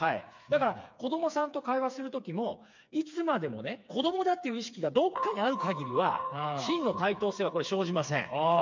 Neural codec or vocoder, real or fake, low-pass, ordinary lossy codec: codec, 16 kHz, 4 kbps, FreqCodec, smaller model; fake; 7.2 kHz; MP3, 64 kbps